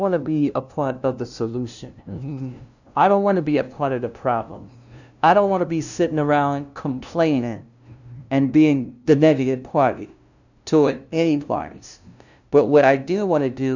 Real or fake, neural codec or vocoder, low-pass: fake; codec, 16 kHz, 0.5 kbps, FunCodec, trained on LibriTTS, 25 frames a second; 7.2 kHz